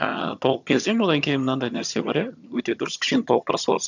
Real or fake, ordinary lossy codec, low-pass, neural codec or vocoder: fake; none; 7.2 kHz; vocoder, 22.05 kHz, 80 mel bands, HiFi-GAN